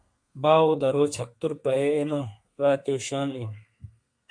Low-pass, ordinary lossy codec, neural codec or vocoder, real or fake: 9.9 kHz; MP3, 48 kbps; codec, 32 kHz, 1.9 kbps, SNAC; fake